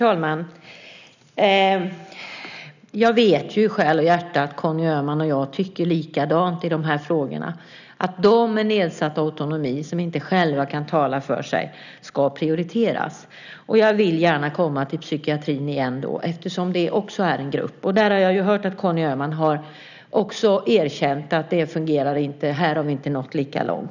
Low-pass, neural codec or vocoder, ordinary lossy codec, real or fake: 7.2 kHz; none; none; real